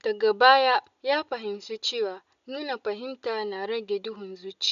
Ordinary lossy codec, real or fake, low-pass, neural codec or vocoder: none; real; 7.2 kHz; none